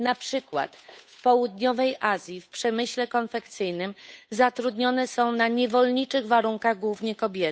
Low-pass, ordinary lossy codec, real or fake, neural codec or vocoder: none; none; fake; codec, 16 kHz, 8 kbps, FunCodec, trained on Chinese and English, 25 frames a second